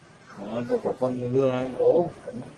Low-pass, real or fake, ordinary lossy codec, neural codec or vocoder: 10.8 kHz; fake; Opus, 24 kbps; codec, 44.1 kHz, 1.7 kbps, Pupu-Codec